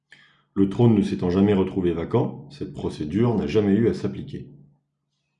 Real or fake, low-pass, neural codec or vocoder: real; 10.8 kHz; none